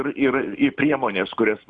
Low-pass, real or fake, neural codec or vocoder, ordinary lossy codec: 10.8 kHz; real; none; Opus, 64 kbps